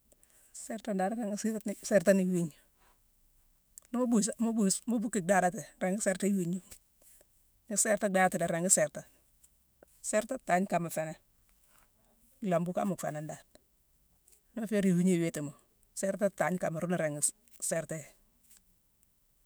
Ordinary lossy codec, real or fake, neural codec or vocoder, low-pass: none; fake; autoencoder, 48 kHz, 128 numbers a frame, DAC-VAE, trained on Japanese speech; none